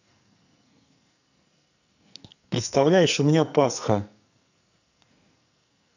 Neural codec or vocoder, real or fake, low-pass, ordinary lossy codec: codec, 44.1 kHz, 2.6 kbps, SNAC; fake; 7.2 kHz; none